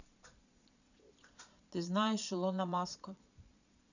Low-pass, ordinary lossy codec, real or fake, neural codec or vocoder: 7.2 kHz; none; fake; vocoder, 22.05 kHz, 80 mel bands, Vocos